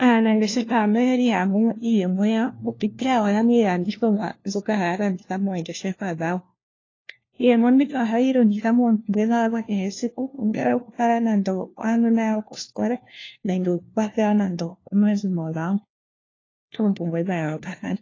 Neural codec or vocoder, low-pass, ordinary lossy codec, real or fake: codec, 16 kHz, 1 kbps, FunCodec, trained on LibriTTS, 50 frames a second; 7.2 kHz; AAC, 32 kbps; fake